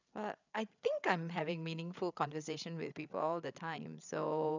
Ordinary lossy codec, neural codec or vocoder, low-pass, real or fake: none; codec, 16 kHz, 8 kbps, FreqCodec, larger model; 7.2 kHz; fake